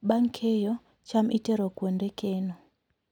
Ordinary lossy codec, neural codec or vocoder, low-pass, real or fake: none; none; 19.8 kHz; real